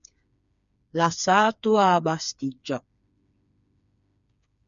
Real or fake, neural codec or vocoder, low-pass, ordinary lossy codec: fake; codec, 16 kHz, 4 kbps, FreqCodec, smaller model; 7.2 kHz; MP3, 96 kbps